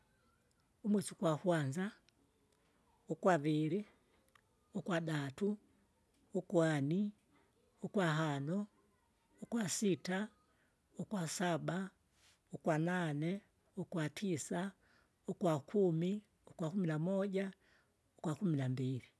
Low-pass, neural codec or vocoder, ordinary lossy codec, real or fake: none; none; none; real